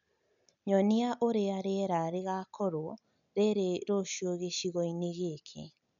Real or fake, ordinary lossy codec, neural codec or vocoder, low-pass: real; none; none; 7.2 kHz